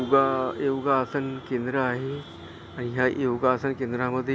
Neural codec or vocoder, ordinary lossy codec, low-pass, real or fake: none; none; none; real